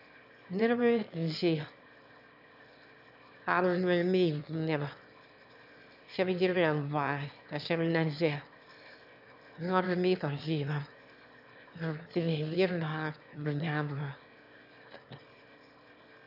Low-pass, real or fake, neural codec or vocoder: 5.4 kHz; fake; autoencoder, 22.05 kHz, a latent of 192 numbers a frame, VITS, trained on one speaker